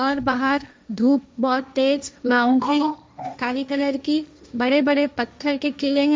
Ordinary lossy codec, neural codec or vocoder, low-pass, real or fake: none; codec, 16 kHz, 1.1 kbps, Voila-Tokenizer; none; fake